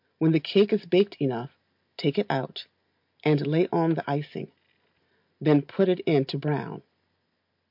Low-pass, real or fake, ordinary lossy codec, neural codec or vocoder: 5.4 kHz; real; MP3, 48 kbps; none